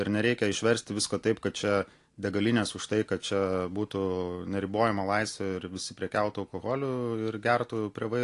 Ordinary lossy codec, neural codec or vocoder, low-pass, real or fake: AAC, 48 kbps; none; 10.8 kHz; real